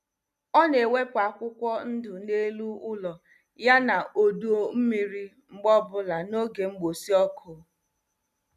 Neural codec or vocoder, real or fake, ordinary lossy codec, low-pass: none; real; none; 14.4 kHz